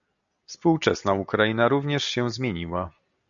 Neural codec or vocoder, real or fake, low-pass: none; real; 7.2 kHz